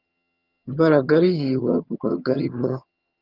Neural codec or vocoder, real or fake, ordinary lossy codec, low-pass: vocoder, 22.05 kHz, 80 mel bands, HiFi-GAN; fake; Opus, 32 kbps; 5.4 kHz